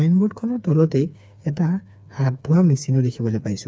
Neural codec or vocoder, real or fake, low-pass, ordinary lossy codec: codec, 16 kHz, 4 kbps, FreqCodec, smaller model; fake; none; none